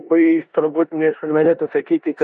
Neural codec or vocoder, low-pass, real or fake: codec, 16 kHz in and 24 kHz out, 0.9 kbps, LongCat-Audio-Codec, four codebook decoder; 10.8 kHz; fake